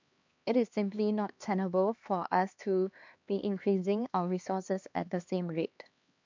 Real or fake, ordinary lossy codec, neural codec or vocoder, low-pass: fake; MP3, 64 kbps; codec, 16 kHz, 2 kbps, X-Codec, HuBERT features, trained on LibriSpeech; 7.2 kHz